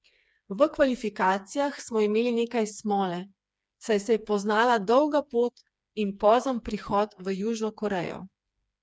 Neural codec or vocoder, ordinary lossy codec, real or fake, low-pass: codec, 16 kHz, 4 kbps, FreqCodec, smaller model; none; fake; none